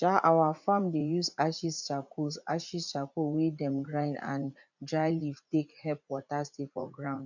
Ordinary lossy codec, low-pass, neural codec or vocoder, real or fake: none; 7.2 kHz; vocoder, 44.1 kHz, 80 mel bands, Vocos; fake